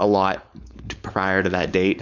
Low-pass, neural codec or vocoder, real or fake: 7.2 kHz; codec, 16 kHz, 4.8 kbps, FACodec; fake